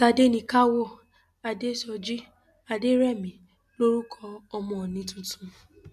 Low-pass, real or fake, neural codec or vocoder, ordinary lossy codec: 14.4 kHz; real; none; none